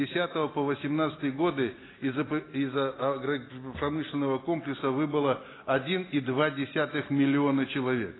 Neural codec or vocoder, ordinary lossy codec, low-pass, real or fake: none; AAC, 16 kbps; 7.2 kHz; real